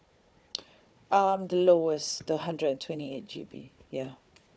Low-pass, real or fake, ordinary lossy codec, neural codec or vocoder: none; fake; none; codec, 16 kHz, 4 kbps, FunCodec, trained on Chinese and English, 50 frames a second